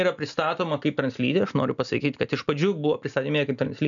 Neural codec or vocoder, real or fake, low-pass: none; real; 7.2 kHz